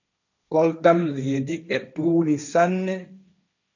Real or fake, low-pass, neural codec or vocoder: fake; 7.2 kHz; codec, 16 kHz, 1.1 kbps, Voila-Tokenizer